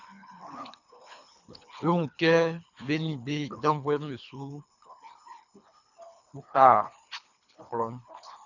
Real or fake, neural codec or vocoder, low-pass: fake; codec, 24 kHz, 3 kbps, HILCodec; 7.2 kHz